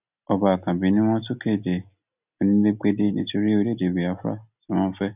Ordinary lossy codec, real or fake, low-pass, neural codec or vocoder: none; real; 3.6 kHz; none